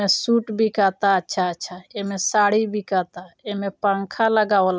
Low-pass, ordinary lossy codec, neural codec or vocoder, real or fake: none; none; none; real